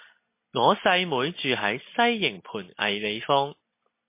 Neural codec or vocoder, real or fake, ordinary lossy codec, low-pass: none; real; MP3, 24 kbps; 3.6 kHz